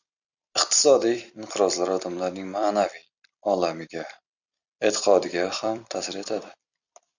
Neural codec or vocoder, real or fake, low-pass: vocoder, 44.1 kHz, 128 mel bands every 256 samples, BigVGAN v2; fake; 7.2 kHz